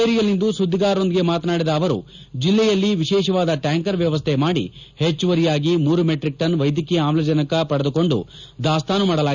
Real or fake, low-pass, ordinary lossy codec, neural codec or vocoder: real; 7.2 kHz; none; none